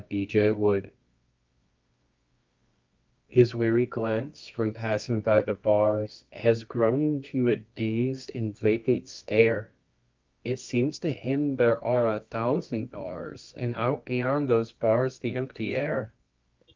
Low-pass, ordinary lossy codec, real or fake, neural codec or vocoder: 7.2 kHz; Opus, 24 kbps; fake; codec, 24 kHz, 0.9 kbps, WavTokenizer, medium music audio release